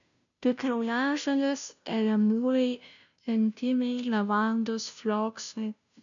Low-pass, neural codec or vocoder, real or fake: 7.2 kHz; codec, 16 kHz, 0.5 kbps, FunCodec, trained on Chinese and English, 25 frames a second; fake